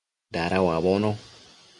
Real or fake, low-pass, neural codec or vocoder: real; 10.8 kHz; none